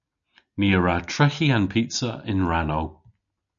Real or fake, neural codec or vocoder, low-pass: real; none; 7.2 kHz